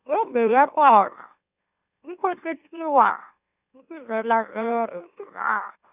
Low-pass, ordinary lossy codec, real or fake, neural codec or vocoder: 3.6 kHz; none; fake; autoencoder, 44.1 kHz, a latent of 192 numbers a frame, MeloTTS